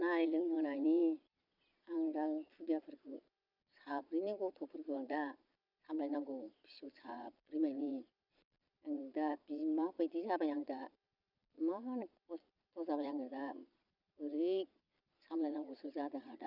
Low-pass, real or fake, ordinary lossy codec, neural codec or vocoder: 5.4 kHz; fake; none; vocoder, 22.05 kHz, 80 mel bands, Vocos